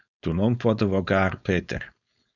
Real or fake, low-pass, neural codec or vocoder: fake; 7.2 kHz; codec, 16 kHz, 4.8 kbps, FACodec